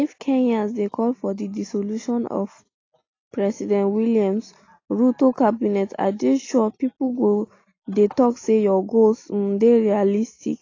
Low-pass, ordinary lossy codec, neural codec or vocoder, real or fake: 7.2 kHz; AAC, 32 kbps; none; real